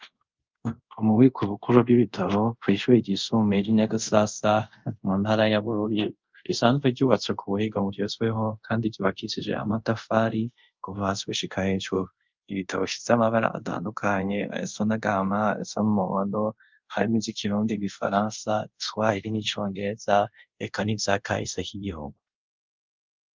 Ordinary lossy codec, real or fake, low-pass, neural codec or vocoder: Opus, 24 kbps; fake; 7.2 kHz; codec, 24 kHz, 0.5 kbps, DualCodec